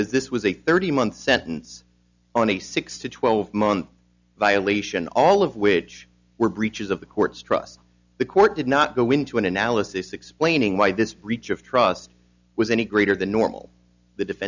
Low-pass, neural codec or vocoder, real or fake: 7.2 kHz; none; real